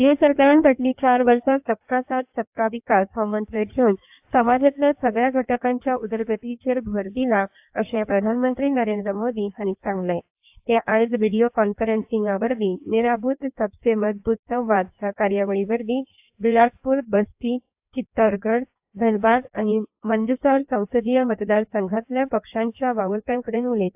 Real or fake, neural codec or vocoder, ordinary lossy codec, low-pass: fake; codec, 16 kHz in and 24 kHz out, 1.1 kbps, FireRedTTS-2 codec; AAC, 32 kbps; 3.6 kHz